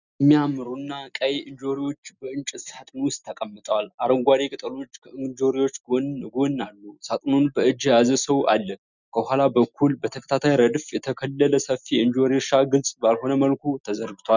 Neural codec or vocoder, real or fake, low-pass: none; real; 7.2 kHz